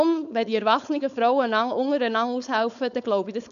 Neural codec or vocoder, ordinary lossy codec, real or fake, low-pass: codec, 16 kHz, 4.8 kbps, FACodec; none; fake; 7.2 kHz